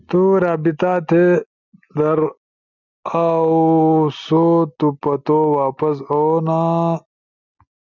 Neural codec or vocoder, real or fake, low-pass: none; real; 7.2 kHz